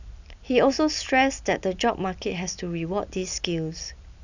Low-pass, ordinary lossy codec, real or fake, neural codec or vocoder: 7.2 kHz; none; real; none